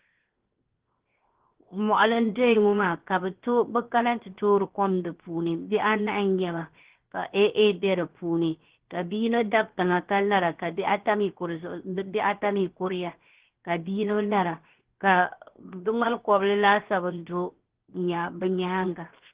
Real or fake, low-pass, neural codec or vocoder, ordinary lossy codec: fake; 3.6 kHz; codec, 16 kHz, 0.7 kbps, FocalCodec; Opus, 16 kbps